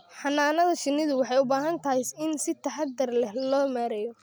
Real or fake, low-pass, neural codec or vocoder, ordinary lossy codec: real; none; none; none